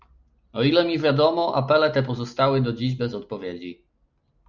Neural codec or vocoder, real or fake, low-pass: none; real; 7.2 kHz